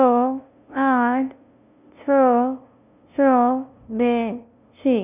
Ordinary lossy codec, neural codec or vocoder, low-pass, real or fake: none; codec, 16 kHz, 0.5 kbps, FunCodec, trained on LibriTTS, 25 frames a second; 3.6 kHz; fake